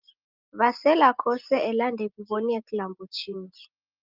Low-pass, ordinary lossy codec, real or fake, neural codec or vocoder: 5.4 kHz; Opus, 32 kbps; real; none